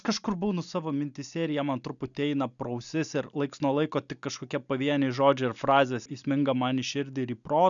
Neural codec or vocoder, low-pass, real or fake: none; 7.2 kHz; real